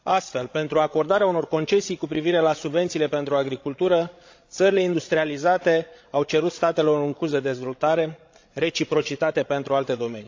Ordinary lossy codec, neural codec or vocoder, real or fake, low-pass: AAC, 48 kbps; codec, 16 kHz, 16 kbps, FreqCodec, larger model; fake; 7.2 kHz